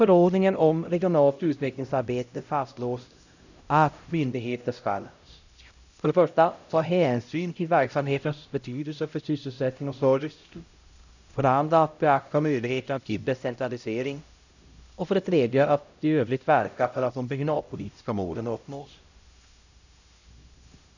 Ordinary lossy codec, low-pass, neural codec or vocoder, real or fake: none; 7.2 kHz; codec, 16 kHz, 0.5 kbps, X-Codec, HuBERT features, trained on LibriSpeech; fake